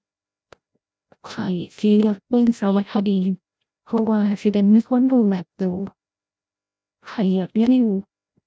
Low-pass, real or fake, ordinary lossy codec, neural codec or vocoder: none; fake; none; codec, 16 kHz, 0.5 kbps, FreqCodec, larger model